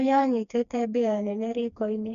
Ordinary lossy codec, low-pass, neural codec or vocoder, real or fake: none; 7.2 kHz; codec, 16 kHz, 2 kbps, FreqCodec, smaller model; fake